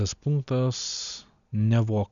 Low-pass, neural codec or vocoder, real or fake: 7.2 kHz; none; real